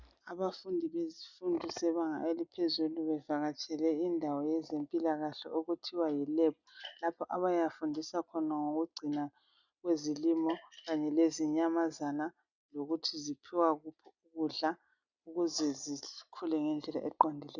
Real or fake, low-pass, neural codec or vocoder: real; 7.2 kHz; none